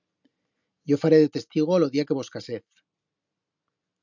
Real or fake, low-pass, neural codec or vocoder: real; 7.2 kHz; none